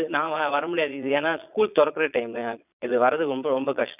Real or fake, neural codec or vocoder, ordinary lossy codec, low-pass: fake; vocoder, 22.05 kHz, 80 mel bands, Vocos; none; 3.6 kHz